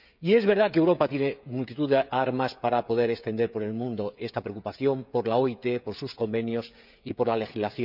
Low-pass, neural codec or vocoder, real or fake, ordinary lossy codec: 5.4 kHz; codec, 16 kHz, 16 kbps, FreqCodec, smaller model; fake; none